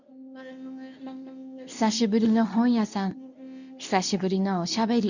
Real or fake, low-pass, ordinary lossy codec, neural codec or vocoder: fake; 7.2 kHz; none; codec, 24 kHz, 0.9 kbps, WavTokenizer, medium speech release version 2